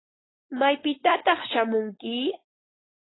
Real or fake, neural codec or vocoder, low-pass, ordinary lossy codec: fake; codec, 16 kHz, 4.8 kbps, FACodec; 7.2 kHz; AAC, 16 kbps